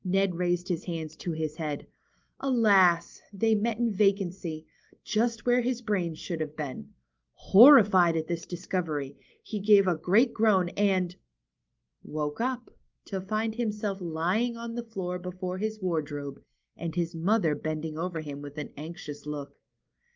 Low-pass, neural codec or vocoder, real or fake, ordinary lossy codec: 7.2 kHz; none; real; Opus, 32 kbps